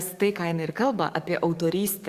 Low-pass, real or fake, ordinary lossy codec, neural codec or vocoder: 14.4 kHz; fake; Opus, 64 kbps; codec, 44.1 kHz, 7.8 kbps, DAC